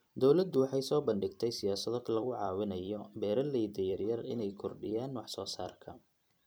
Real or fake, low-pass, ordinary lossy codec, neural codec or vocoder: fake; none; none; vocoder, 44.1 kHz, 128 mel bands every 256 samples, BigVGAN v2